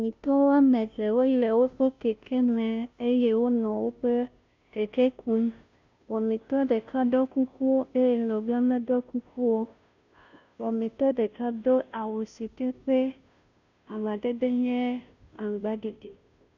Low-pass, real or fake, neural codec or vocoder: 7.2 kHz; fake; codec, 16 kHz, 0.5 kbps, FunCodec, trained on Chinese and English, 25 frames a second